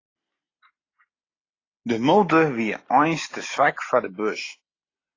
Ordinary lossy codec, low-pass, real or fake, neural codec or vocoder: AAC, 32 kbps; 7.2 kHz; real; none